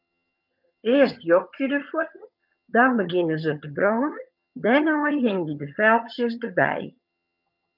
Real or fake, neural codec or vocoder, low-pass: fake; vocoder, 22.05 kHz, 80 mel bands, HiFi-GAN; 5.4 kHz